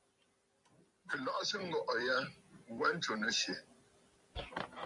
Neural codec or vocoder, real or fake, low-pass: vocoder, 44.1 kHz, 128 mel bands every 256 samples, BigVGAN v2; fake; 10.8 kHz